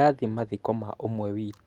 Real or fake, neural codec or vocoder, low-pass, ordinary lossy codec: real; none; 19.8 kHz; Opus, 24 kbps